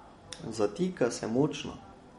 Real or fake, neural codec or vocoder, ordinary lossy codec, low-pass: real; none; MP3, 48 kbps; 19.8 kHz